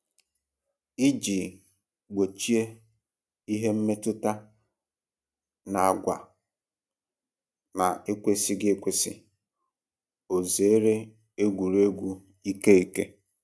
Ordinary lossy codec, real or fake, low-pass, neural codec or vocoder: none; real; none; none